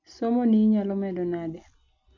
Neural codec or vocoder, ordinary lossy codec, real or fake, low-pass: none; MP3, 64 kbps; real; 7.2 kHz